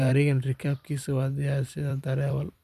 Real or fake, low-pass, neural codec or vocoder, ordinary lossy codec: fake; 14.4 kHz; vocoder, 44.1 kHz, 128 mel bands every 256 samples, BigVGAN v2; none